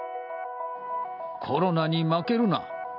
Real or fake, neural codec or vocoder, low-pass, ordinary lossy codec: real; none; 5.4 kHz; none